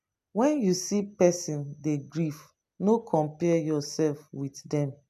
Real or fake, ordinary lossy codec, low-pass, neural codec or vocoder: real; none; 14.4 kHz; none